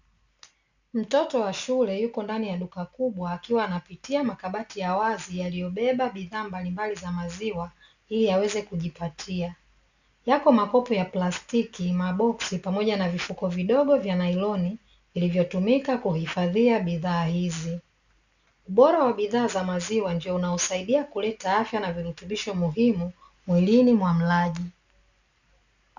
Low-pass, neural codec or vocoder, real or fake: 7.2 kHz; none; real